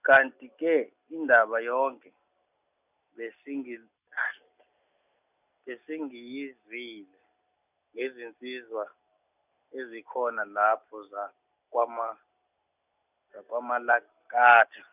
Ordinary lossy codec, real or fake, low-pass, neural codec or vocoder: none; real; 3.6 kHz; none